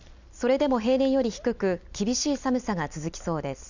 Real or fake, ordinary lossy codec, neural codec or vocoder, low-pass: real; none; none; 7.2 kHz